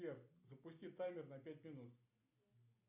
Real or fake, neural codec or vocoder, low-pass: real; none; 3.6 kHz